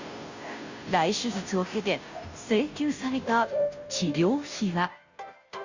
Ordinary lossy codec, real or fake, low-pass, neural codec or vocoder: Opus, 64 kbps; fake; 7.2 kHz; codec, 16 kHz, 0.5 kbps, FunCodec, trained on Chinese and English, 25 frames a second